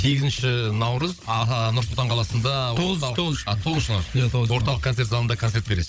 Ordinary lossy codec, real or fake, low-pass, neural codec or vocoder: none; fake; none; codec, 16 kHz, 16 kbps, FunCodec, trained on Chinese and English, 50 frames a second